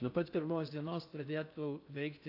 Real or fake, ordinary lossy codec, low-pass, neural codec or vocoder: fake; AAC, 32 kbps; 5.4 kHz; codec, 16 kHz in and 24 kHz out, 0.6 kbps, FocalCodec, streaming, 4096 codes